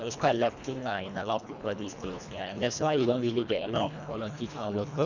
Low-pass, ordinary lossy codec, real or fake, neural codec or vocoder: 7.2 kHz; none; fake; codec, 24 kHz, 1.5 kbps, HILCodec